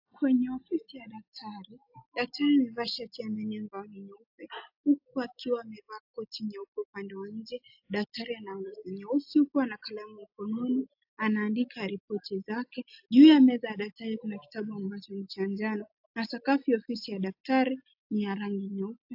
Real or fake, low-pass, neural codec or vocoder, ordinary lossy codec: real; 5.4 kHz; none; AAC, 48 kbps